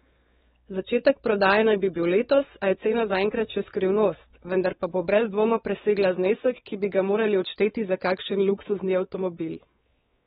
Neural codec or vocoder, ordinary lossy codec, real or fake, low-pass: codec, 16 kHz, 4 kbps, X-Codec, WavLM features, trained on Multilingual LibriSpeech; AAC, 16 kbps; fake; 7.2 kHz